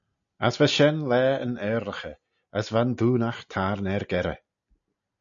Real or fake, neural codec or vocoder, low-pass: real; none; 7.2 kHz